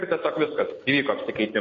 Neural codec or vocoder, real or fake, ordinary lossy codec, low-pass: none; real; MP3, 32 kbps; 7.2 kHz